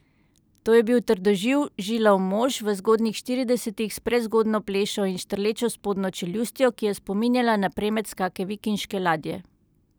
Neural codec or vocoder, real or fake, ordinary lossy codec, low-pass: none; real; none; none